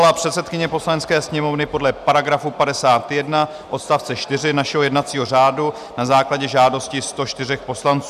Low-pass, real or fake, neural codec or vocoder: 14.4 kHz; real; none